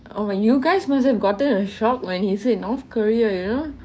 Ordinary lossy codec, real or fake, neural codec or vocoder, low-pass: none; fake; codec, 16 kHz, 6 kbps, DAC; none